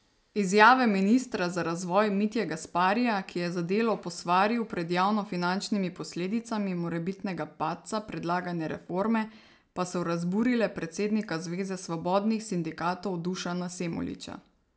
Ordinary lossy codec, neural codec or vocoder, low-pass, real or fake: none; none; none; real